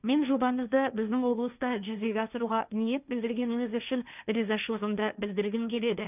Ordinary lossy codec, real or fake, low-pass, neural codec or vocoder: none; fake; 3.6 kHz; codec, 16 kHz, 1.1 kbps, Voila-Tokenizer